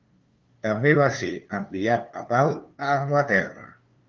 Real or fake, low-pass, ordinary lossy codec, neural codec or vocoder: fake; 7.2 kHz; Opus, 24 kbps; codec, 16 kHz, 2 kbps, FunCodec, trained on LibriTTS, 25 frames a second